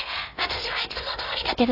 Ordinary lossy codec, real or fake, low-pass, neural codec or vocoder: AAC, 32 kbps; fake; 5.4 kHz; codec, 16 kHz in and 24 kHz out, 0.6 kbps, FocalCodec, streaming, 4096 codes